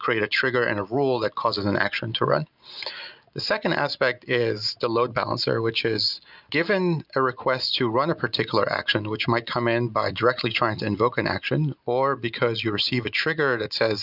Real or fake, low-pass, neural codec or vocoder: real; 5.4 kHz; none